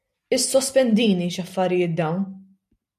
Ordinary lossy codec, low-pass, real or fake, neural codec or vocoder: MP3, 96 kbps; 14.4 kHz; real; none